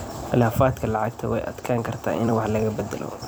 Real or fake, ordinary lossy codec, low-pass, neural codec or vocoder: fake; none; none; vocoder, 44.1 kHz, 128 mel bands every 256 samples, BigVGAN v2